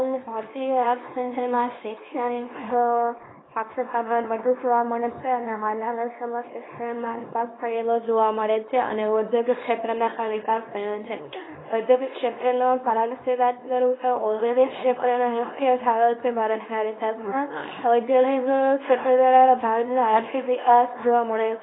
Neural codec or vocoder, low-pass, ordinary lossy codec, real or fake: codec, 24 kHz, 0.9 kbps, WavTokenizer, small release; 7.2 kHz; AAC, 16 kbps; fake